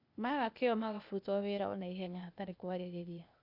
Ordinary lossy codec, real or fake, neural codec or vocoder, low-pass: none; fake; codec, 16 kHz, 0.8 kbps, ZipCodec; 5.4 kHz